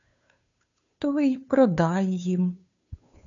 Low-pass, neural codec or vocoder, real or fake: 7.2 kHz; codec, 16 kHz, 2 kbps, FunCodec, trained on Chinese and English, 25 frames a second; fake